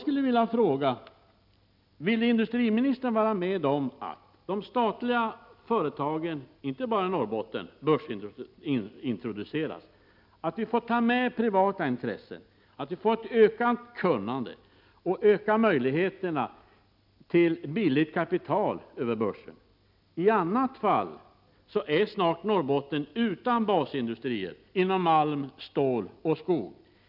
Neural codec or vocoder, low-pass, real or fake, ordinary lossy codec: none; 5.4 kHz; real; none